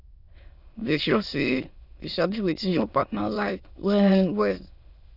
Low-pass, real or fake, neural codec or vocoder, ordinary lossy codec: 5.4 kHz; fake; autoencoder, 22.05 kHz, a latent of 192 numbers a frame, VITS, trained on many speakers; none